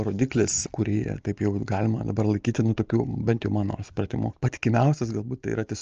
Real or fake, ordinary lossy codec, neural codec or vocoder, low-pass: real; Opus, 24 kbps; none; 7.2 kHz